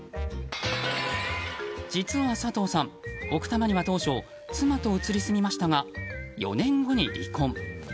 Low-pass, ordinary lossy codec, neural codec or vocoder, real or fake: none; none; none; real